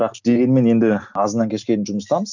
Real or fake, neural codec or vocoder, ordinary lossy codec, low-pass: real; none; none; 7.2 kHz